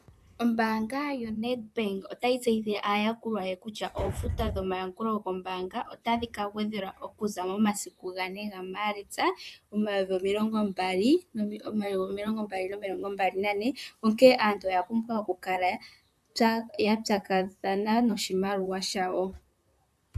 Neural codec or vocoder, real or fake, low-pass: vocoder, 44.1 kHz, 128 mel bands, Pupu-Vocoder; fake; 14.4 kHz